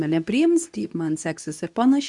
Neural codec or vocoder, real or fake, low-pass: codec, 24 kHz, 0.9 kbps, WavTokenizer, medium speech release version 2; fake; 10.8 kHz